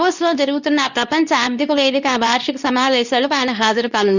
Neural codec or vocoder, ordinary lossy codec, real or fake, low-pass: codec, 24 kHz, 0.9 kbps, WavTokenizer, medium speech release version 2; none; fake; 7.2 kHz